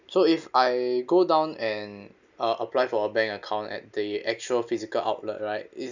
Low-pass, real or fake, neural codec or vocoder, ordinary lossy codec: 7.2 kHz; real; none; none